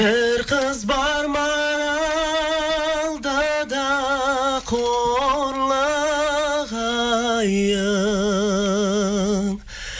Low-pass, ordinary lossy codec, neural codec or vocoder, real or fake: none; none; none; real